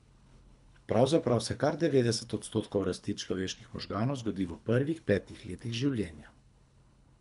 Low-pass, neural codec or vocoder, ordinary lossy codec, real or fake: 10.8 kHz; codec, 24 kHz, 3 kbps, HILCodec; none; fake